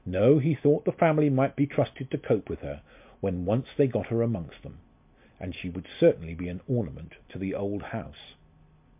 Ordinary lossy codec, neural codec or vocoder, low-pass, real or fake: MP3, 32 kbps; none; 3.6 kHz; real